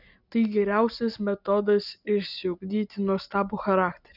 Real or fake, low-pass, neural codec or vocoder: real; 5.4 kHz; none